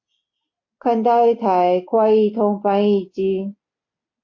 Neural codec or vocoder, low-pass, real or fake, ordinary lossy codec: none; 7.2 kHz; real; AAC, 48 kbps